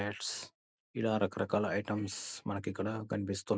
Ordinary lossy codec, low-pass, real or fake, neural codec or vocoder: none; none; real; none